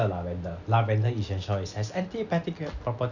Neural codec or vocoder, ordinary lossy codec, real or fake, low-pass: none; none; real; 7.2 kHz